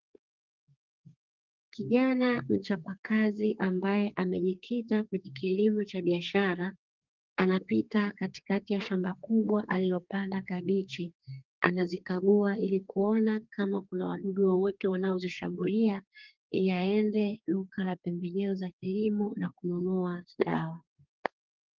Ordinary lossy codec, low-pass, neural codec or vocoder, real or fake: Opus, 32 kbps; 7.2 kHz; codec, 32 kHz, 1.9 kbps, SNAC; fake